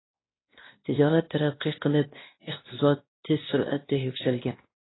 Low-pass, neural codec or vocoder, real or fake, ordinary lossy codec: 7.2 kHz; codec, 16 kHz, 1 kbps, X-Codec, WavLM features, trained on Multilingual LibriSpeech; fake; AAC, 16 kbps